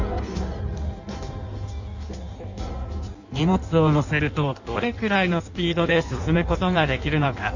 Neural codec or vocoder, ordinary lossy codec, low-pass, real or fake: codec, 16 kHz in and 24 kHz out, 1.1 kbps, FireRedTTS-2 codec; none; 7.2 kHz; fake